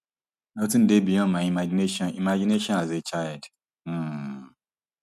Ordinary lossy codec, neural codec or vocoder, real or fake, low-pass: none; none; real; 14.4 kHz